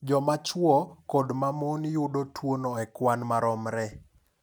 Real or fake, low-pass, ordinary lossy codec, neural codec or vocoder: real; none; none; none